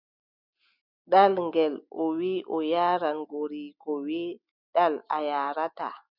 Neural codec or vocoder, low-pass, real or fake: none; 5.4 kHz; real